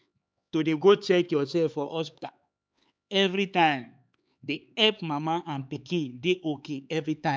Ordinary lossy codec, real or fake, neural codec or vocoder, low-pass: none; fake; codec, 16 kHz, 4 kbps, X-Codec, HuBERT features, trained on LibriSpeech; none